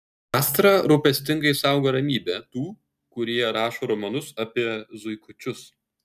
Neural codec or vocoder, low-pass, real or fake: none; 14.4 kHz; real